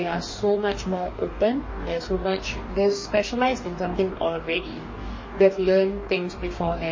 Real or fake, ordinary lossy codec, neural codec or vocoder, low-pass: fake; MP3, 32 kbps; codec, 44.1 kHz, 2.6 kbps, DAC; 7.2 kHz